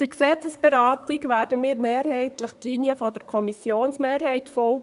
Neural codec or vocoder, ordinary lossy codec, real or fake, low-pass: codec, 24 kHz, 1 kbps, SNAC; none; fake; 10.8 kHz